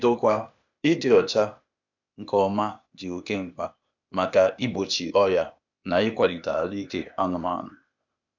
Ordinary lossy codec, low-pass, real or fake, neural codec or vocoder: none; 7.2 kHz; fake; codec, 16 kHz, 0.8 kbps, ZipCodec